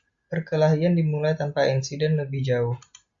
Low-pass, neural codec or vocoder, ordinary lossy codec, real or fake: 7.2 kHz; none; Opus, 64 kbps; real